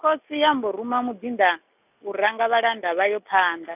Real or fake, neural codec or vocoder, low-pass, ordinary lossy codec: real; none; 3.6 kHz; none